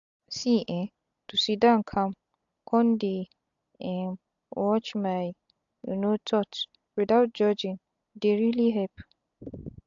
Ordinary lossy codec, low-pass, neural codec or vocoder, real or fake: AAC, 64 kbps; 7.2 kHz; none; real